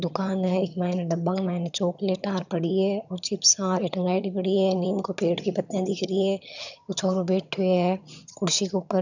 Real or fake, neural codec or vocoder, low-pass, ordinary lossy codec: fake; vocoder, 22.05 kHz, 80 mel bands, HiFi-GAN; 7.2 kHz; none